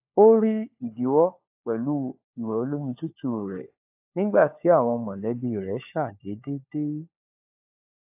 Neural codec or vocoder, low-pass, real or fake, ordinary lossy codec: codec, 16 kHz, 4 kbps, FunCodec, trained on LibriTTS, 50 frames a second; 3.6 kHz; fake; AAC, 32 kbps